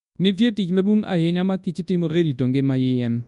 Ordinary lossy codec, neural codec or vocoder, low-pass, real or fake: none; codec, 24 kHz, 0.9 kbps, WavTokenizer, large speech release; 10.8 kHz; fake